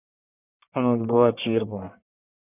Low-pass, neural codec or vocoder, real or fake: 3.6 kHz; codec, 44.1 kHz, 1.7 kbps, Pupu-Codec; fake